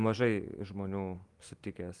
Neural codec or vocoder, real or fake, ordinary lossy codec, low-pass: none; real; Opus, 24 kbps; 10.8 kHz